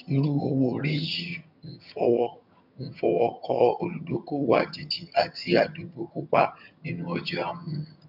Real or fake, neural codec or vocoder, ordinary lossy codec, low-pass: fake; vocoder, 22.05 kHz, 80 mel bands, HiFi-GAN; none; 5.4 kHz